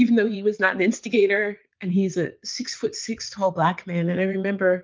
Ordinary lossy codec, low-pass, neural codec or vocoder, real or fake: Opus, 32 kbps; 7.2 kHz; vocoder, 22.05 kHz, 80 mel bands, WaveNeXt; fake